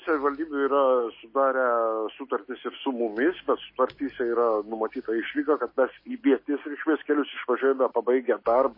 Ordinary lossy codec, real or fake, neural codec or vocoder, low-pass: MP3, 24 kbps; real; none; 5.4 kHz